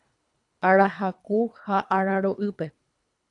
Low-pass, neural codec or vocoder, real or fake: 10.8 kHz; codec, 24 kHz, 3 kbps, HILCodec; fake